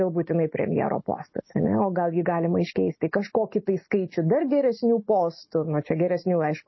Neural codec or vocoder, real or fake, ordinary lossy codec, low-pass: none; real; MP3, 24 kbps; 7.2 kHz